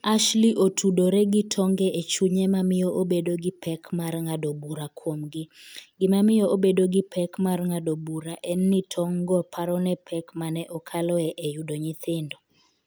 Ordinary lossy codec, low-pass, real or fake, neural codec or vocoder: none; none; real; none